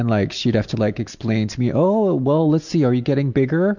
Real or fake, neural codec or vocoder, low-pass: real; none; 7.2 kHz